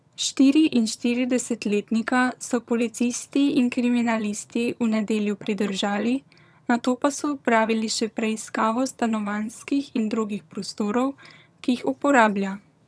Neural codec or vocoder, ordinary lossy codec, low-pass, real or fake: vocoder, 22.05 kHz, 80 mel bands, HiFi-GAN; none; none; fake